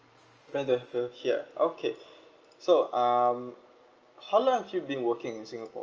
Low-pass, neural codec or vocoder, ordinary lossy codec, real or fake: 7.2 kHz; none; Opus, 24 kbps; real